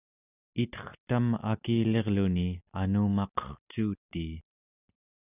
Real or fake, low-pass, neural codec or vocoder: real; 3.6 kHz; none